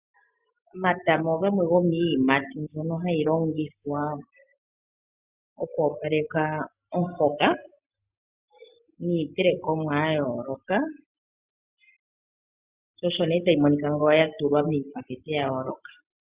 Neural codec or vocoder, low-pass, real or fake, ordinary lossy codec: none; 3.6 kHz; real; Opus, 64 kbps